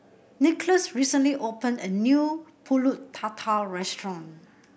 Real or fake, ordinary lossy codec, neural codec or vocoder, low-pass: real; none; none; none